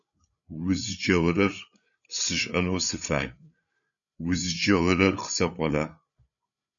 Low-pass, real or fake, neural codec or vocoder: 7.2 kHz; fake; codec, 16 kHz, 8 kbps, FreqCodec, larger model